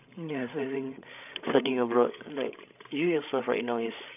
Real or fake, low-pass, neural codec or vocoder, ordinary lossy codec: fake; 3.6 kHz; codec, 16 kHz, 16 kbps, FreqCodec, larger model; none